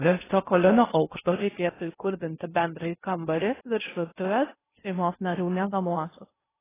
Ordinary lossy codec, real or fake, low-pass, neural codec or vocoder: AAC, 16 kbps; fake; 3.6 kHz; codec, 16 kHz in and 24 kHz out, 0.6 kbps, FocalCodec, streaming, 4096 codes